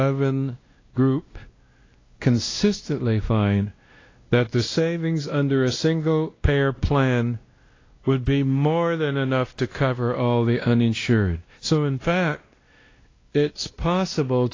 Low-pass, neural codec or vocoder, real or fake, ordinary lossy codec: 7.2 kHz; codec, 16 kHz, 1 kbps, X-Codec, WavLM features, trained on Multilingual LibriSpeech; fake; AAC, 32 kbps